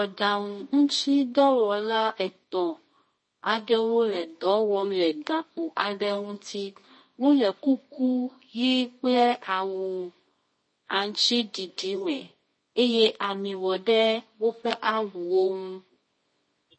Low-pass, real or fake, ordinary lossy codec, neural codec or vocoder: 9.9 kHz; fake; MP3, 32 kbps; codec, 24 kHz, 0.9 kbps, WavTokenizer, medium music audio release